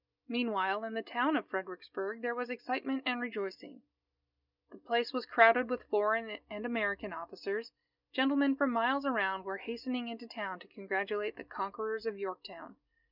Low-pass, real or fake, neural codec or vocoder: 5.4 kHz; real; none